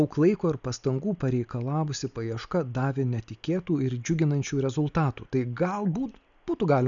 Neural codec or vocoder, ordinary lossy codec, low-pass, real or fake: none; MP3, 96 kbps; 7.2 kHz; real